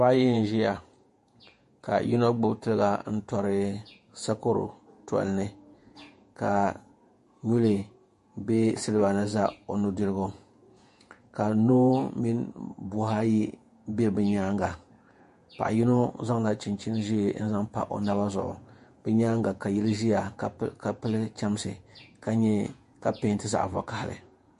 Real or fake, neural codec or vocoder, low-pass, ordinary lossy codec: fake; vocoder, 48 kHz, 128 mel bands, Vocos; 14.4 kHz; MP3, 48 kbps